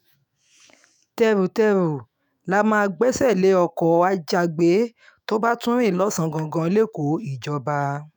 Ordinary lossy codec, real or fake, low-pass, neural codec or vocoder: none; fake; none; autoencoder, 48 kHz, 128 numbers a frame, DAC-VAE, trained on Japanese speech